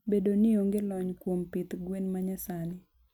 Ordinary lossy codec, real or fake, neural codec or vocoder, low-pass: none; real; none; 19.8 kHz